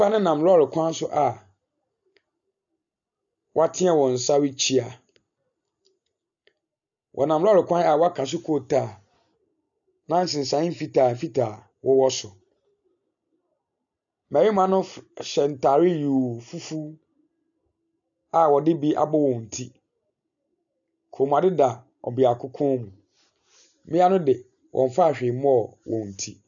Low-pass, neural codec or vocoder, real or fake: 7.2 kHz; none; real